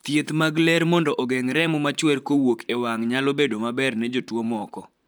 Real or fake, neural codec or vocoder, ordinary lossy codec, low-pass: fake; vocoder, 44.1 kHz, 128 mel bands, Pupu-Vocoder; none; none